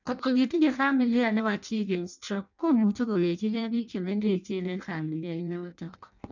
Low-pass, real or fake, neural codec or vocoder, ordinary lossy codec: 7.2 kHz; fake; codec, 16 kHz in and 24 kHz out, 0.6 kbps, FireRedTTS-2 codec; none